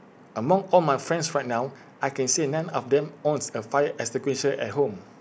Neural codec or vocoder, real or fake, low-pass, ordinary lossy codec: none; real; none; none